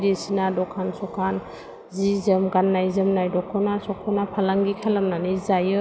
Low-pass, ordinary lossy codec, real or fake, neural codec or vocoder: none; none; real; none